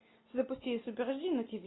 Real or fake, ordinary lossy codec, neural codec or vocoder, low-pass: real; AAC, 16 kbps; none; 7.2 kHz